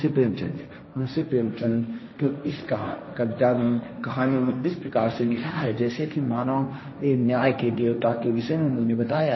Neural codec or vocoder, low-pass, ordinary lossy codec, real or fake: codec, 16 kHz, 1.1 kbps, Voila-Tokenizer; 7.2 kHz; MP3, 24 kbps; fake